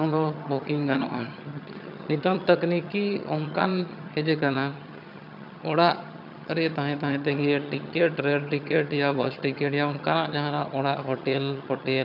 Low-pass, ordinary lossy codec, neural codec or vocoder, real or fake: 5.4 kHz; none; vocoder, 22.05 kHz, 80 mel bands, HiFi-GAN; fake